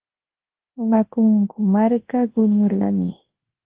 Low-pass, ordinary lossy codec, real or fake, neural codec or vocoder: 3.6 kHz; Opus, 16 kbps; fake; codec, 24 kHz, 0.9 kbps, WavTokenizer, large speech release